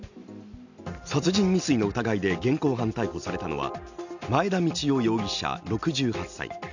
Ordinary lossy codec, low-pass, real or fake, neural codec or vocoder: none; 7.2 kHz; real; none